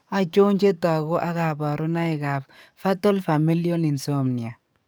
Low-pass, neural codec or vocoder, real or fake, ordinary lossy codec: none; codec, 44.1 kHz, 7.8 kbps, DAC; fake; none